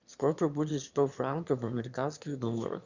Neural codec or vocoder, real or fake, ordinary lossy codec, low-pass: autoencoder, 22.05 kHz, a latent of 192 numbers a frame, VITS, trained on one speaker; fake; Opus, 64 kbps; 7.2 kHz